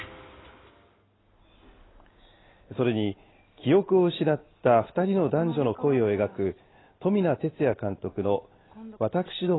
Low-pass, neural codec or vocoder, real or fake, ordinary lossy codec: 7.2 kHz; none; real; AAC, 16 kbps